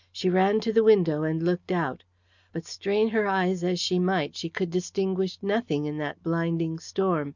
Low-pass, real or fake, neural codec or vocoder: 7.2 kHz; real; none